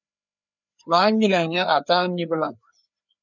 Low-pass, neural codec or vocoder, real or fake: 7.2 kHz; codec, 16 kHz, 2 kbps, FreqCodec, larger model; fake